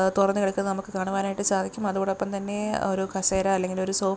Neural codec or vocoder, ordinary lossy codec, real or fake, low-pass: none; none; real; none